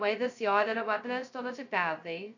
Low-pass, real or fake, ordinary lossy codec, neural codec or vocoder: 7.2 kHz; fake; none; codec, 16 kHz, 0.2 kbps, FocalCodec